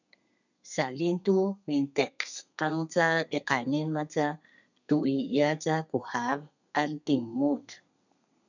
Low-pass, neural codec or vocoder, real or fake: 7.2 kHz; codec, 32 kHz, 1.9 kbps, SNAC; fake